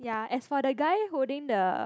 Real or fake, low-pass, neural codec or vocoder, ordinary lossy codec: real; none; none; none